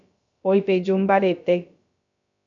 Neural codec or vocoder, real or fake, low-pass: codec, 16 kHz, about 1 kbps, DyCAST, with the encoder's durations; fake; 7.2 kHz